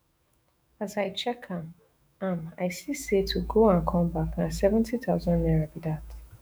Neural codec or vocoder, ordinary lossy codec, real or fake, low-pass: autoencoder, 48 kHz, 128 numbers a frame, DAC-VAE, trained on Japanese speech; none; fake; none